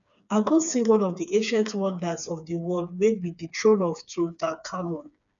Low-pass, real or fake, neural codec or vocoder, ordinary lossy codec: 7.2 kHz; fake; codec, 16 kHz, 4 kbps, FreqCodec, smaller model; none